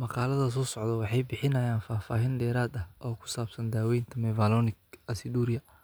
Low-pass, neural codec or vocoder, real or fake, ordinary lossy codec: none; none; real; none